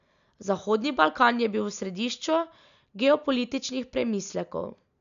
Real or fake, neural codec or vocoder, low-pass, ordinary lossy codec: real; none; 7.2 kHz; none